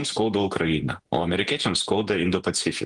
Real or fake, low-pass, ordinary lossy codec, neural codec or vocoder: fake; 10.8 kHz; Opus, 24 kbps; vocoder, 48 kHz, 128 mel bands, Vocos